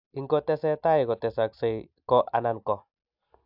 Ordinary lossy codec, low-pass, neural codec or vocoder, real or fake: AAC, 48 kbps; 5.4 kHz; none; real